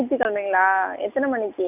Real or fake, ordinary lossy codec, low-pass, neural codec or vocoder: real; none; 3.6 kHz; none